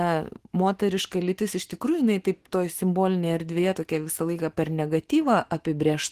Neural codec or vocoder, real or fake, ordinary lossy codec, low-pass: autoencoder, 48 kHz, 128 numbers a frame, DAC-VAE, trained on Japanese speech; fake; Opus, 16 kbps; 14.4 kHz